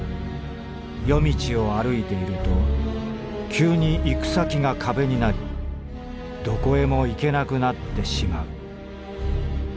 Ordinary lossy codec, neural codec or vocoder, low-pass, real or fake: none; none; none; real